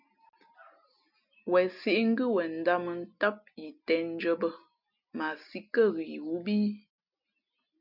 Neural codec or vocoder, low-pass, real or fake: none; 5.4 kHz; real